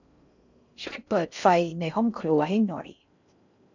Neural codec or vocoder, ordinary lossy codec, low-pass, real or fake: codec, 16 kHz in and 24 kHz out, 0.6 kbps, FocalCodec, streaming, 4096 codes; none; 7.2 kHz; fake